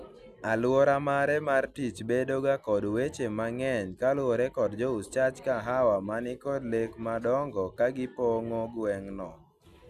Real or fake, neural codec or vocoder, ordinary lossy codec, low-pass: real; none; none; 14.4 kHz